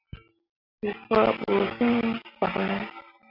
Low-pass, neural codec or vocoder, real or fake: 5.4 kHz; none; real